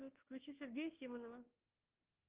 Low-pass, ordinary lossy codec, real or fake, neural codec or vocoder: 3.6 kHz; Opus, 16 kbps; fake; codec, 24 kHz, 1.2 kbps, DualCodec